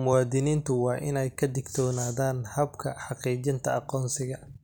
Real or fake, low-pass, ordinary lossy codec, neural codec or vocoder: real; none; none; none